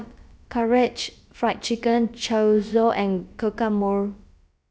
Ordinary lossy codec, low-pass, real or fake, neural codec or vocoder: none; none; fake; codec, 16 kHz, about 1 kbps, DyCAST, with the encoder's durations